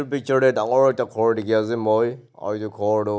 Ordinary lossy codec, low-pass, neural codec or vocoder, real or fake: none; none; none; real